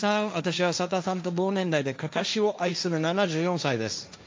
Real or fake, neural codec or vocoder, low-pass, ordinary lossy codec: fake; codec, 16 kHz, 1.1 kbps, Voila-Tokenizer; none; none